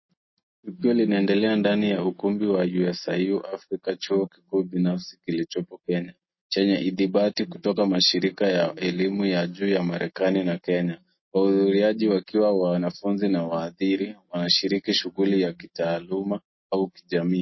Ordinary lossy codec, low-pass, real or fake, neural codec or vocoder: MP3, 24 kbps; 7.2 kHz; real; none